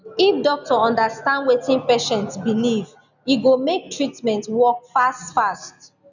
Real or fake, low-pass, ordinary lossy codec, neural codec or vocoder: real; 7.2 kHz; none; none